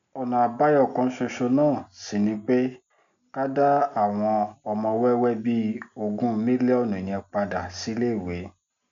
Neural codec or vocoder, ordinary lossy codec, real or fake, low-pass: none; none; real; 7.2 kHz